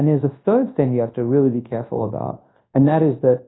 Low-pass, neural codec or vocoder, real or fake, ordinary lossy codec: 7.2 kHz; codec, 24 kHz, 0.9 kbps, WavTokenizer, large speech release; fake; AAC, 16 kbps